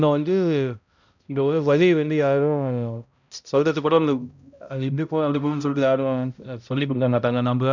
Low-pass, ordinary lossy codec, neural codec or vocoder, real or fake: 7.2 kHz; none; codec, 16 kHz, 0.5 kbps, X-Codec, HuBERT features, trained on balanced general audio; fake